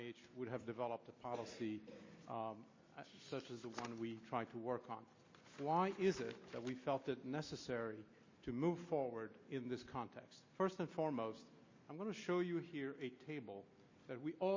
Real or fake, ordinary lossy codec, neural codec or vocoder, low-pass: real; MP3, 32 kbps; none; 7.2 kHz